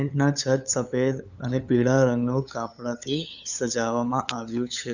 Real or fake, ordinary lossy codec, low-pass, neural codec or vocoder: fake; none; 7.2 kHz; codec, 16 kHz, 8 kbps, FunCodec, trained on LibriTTS, 25 frames a second